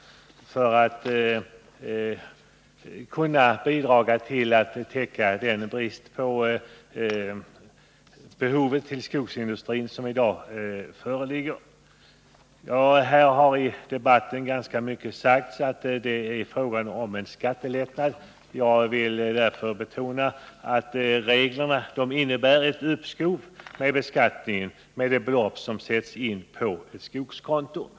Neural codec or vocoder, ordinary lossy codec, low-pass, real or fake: none; none; none; real